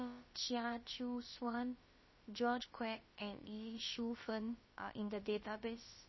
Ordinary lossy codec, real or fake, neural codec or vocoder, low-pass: MP3, 24 kbps; fake; codec, 16 kHz, about 1 kbps, DyCAST, with the encoder's durations; 7.2 kHz